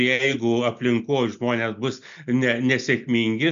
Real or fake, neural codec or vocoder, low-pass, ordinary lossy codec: real; none; 7.2 kHz; MP3, 64 kbps